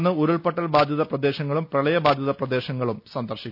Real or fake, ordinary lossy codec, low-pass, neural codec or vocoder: real; none; 5.4 kHz; none